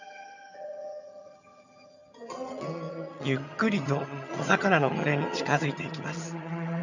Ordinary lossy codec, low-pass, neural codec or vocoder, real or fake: none; 7.2 kHz; vocoder, 22.05 kHz, 80 mel bands, HiFi-GAN; fake